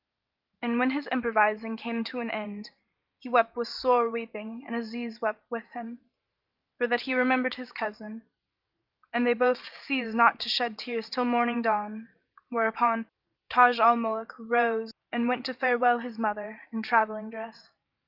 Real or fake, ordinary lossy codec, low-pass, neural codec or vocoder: fake; Opus, 24 kbps; 5.4 kHz; vocoder, 44.1 kHz, 128 mel bands every 512 samples, BigVGAN v2